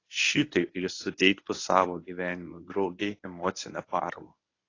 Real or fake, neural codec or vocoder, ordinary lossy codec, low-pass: fake; codec, 24 kHz, 0.9 kbps, WavTokenizer, medium speech release version 1; AAC, 32 kbps; 7.2 kHz